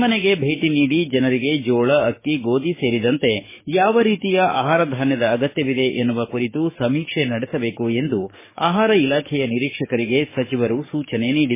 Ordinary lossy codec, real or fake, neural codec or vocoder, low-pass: MP3, 16 kbps; fake; codec, 44.1 kHz, 7.8 kbps, DAC; 3.6 kHz